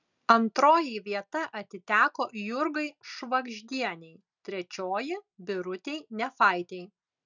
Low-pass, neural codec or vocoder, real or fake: 7.2 kHz; none; real